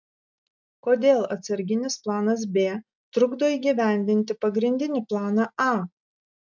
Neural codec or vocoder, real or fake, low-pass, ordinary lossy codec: none; real; 7.2 kHz; MP3, 64 kbps